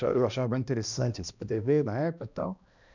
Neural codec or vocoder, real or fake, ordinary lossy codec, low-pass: codec, 16 kHz, 1 kbps, X-Codec, HuBERT features, trained on balanced general audio; fake; none; 7.2 kHz